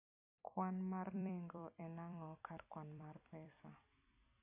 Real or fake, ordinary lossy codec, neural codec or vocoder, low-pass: fake; AAC, 24 kbps; vocoder, 44.1 kHz, 128 mel bands every 256 samples, BigVGAN v2; 3.6 kHz